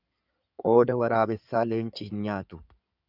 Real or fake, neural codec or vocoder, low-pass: fake; codec, 16 kHz in and 24 kHz out, 2.2 kbps, FireRedTTS-2 codec; 5.4 kHz